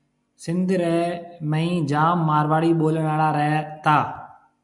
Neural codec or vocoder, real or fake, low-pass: none; real; 10.8 kHz